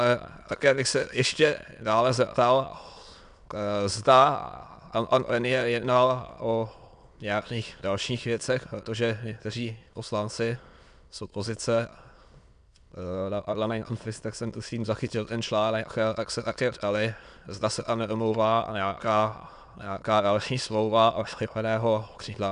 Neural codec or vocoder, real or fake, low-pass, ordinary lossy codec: autoencoder, 22.05 kHz, a latent of 192 numbers a frame, VITS, trained on many speakers; fake; 9.9 kHz; MP3, 96 kbps